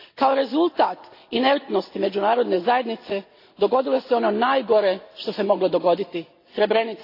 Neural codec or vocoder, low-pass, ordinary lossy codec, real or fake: none; 5.4 kHz; AAC, 32 kbps; real